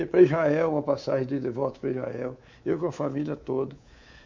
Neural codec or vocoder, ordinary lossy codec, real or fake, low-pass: codec, 24 kHz, 3.1 kbps, DualCodec; MP3, 64 kbps; fake; 7.2 kHz